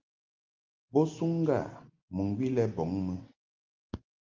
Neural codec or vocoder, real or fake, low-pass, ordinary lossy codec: none; real; 7.2 kHz; Opus, 32 kbps